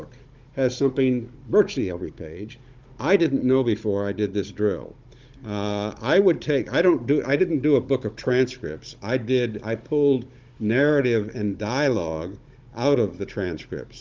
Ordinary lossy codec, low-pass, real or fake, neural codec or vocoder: Opus, 32 kbps; 7.2 kHz; fake; codec, 16 kHz, 16 kbps, FunCodec, trained on Chinese and English, 50 frames a second